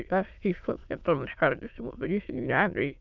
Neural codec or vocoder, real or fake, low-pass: autoencoder, 22.05 kHz, a latent of 192 numbers a frame, VITS, trained on many speakers; fake; 7.2 kHz